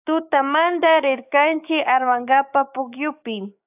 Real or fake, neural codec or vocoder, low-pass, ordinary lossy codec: fake; codec, 16 kHz, 6 kbps, DAC; 3.6 kHz; AAC, 32 kbps